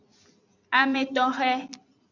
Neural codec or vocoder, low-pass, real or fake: vocoder, 44.1 kHz, 128 mel bands every 256 samples, BigVGAN v2; 7.2 kHz; fake